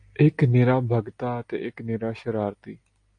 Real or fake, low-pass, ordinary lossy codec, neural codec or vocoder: real; 9.9 kHz; AAC, 48 kbps; none